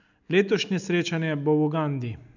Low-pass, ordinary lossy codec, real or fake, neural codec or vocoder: 7.2 kHz; none; real; none